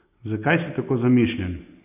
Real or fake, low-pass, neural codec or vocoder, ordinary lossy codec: real; 3.6 kHz; none; none